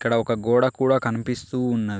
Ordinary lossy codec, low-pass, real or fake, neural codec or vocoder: none; none; real; none